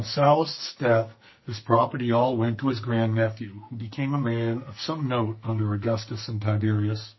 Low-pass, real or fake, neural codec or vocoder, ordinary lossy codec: 7.2 kHz; fake; codec, 44.1 kHz, 2.6 kbps, SNAC; MP3, 24 kbps